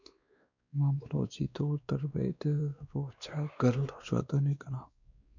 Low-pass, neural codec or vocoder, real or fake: 7.2 kHz; codec, 16 kHz, 2 kbps, X-Codec, WavLM features, trained on Multilingual LibriSpeech; fake